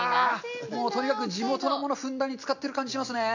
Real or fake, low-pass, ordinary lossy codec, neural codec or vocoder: real; 7.2 kHz; none; none